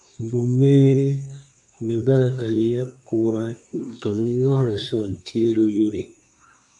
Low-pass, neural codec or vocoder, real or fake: 10.8 kHz; codec, 24 kHz, 1 kbps, SNAC; fake